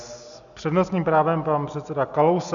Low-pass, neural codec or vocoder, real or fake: 7.2 kHz; none; real